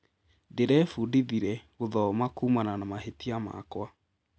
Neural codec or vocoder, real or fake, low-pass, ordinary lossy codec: none; real; none; none